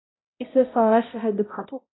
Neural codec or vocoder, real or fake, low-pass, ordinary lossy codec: codec, 16 kHz, 0.5 kbps, X-Codec, HuBERT features, trained on balanced general audio; fake; 7.2 kHz; AAC, 16 kbps